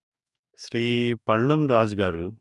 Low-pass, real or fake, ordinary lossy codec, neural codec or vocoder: 10.8 kHz; fake; none; codec, 44.1 kHz, 2.6 kbps, DAC